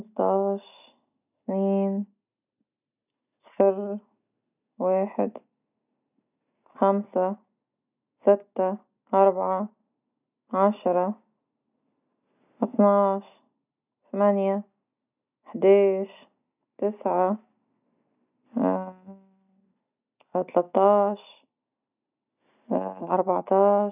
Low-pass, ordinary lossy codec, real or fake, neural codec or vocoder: 3.6 kHz; none; real; none